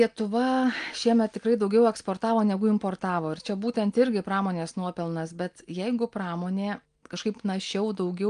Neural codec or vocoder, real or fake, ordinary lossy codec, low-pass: none; real; Opus, 32 kbps; 9.9 kHz